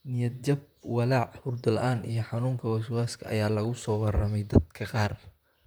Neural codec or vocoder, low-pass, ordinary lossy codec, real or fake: vocoder, 44.1 kHz, 128 mel bands, Pupu-Vocoder; none; none; fake